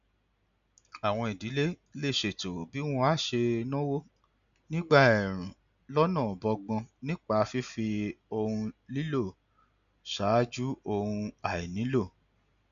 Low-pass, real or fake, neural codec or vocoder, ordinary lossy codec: 7.2 kHz; real; none; MP3, 96 kbps